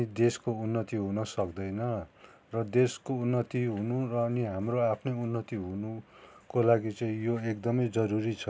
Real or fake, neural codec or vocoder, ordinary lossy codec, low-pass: real; none; none; none